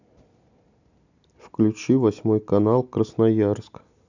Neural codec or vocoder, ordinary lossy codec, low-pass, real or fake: none; none; 7.2 kHz; real